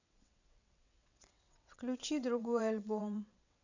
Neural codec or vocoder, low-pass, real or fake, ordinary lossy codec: vocoder, 22.05 kHz, 80 mel bands, WaveNeXt; 7.2 kHz; fake; none